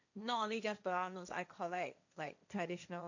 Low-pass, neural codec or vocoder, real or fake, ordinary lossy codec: 7.2 kHz; codec, 16 kHz, 1.1 kbps, Voila-Tokenizer; fake; none